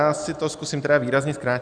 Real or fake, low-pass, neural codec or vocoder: real; 9.9 kHz; none